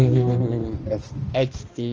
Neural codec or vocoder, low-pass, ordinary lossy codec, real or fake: codec, 16 kHz, 1 kbps, X-Codec, HuBERT features, trained on balanced general audio; 7.2 kHz; Opus, 24 kbps; fake